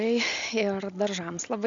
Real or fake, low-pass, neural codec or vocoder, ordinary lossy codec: real; 7.2 kHz; none; Opus, 64 kbps